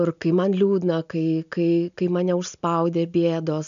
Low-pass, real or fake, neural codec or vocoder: 7.2 kHz; real; none